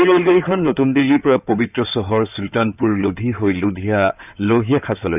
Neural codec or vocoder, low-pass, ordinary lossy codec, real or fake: codec, 16 kHz in and 24 kHz out, 2.2 kbps, FireRedTTS-2 codec; 3.6 kHz; none; fake